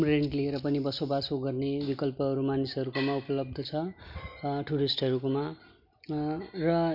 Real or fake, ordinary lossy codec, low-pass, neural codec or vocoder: real; none; 5.4 kHz; none